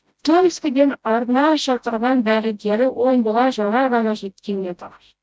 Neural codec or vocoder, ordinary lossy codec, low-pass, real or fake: codec, 16 kHz, 0.5 kbps, FreqCodec, smaller model; none; none; fake